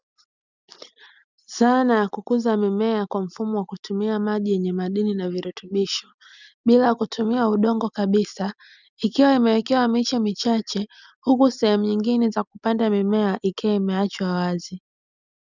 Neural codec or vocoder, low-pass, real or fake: none; 7.2 kHz; real